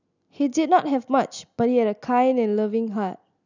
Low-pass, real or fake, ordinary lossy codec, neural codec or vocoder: 7.2 kHz; real; none; none